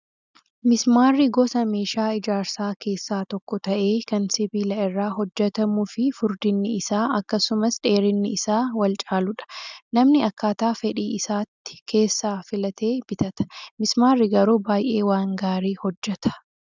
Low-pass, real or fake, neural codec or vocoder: 7.2 kHz; real; none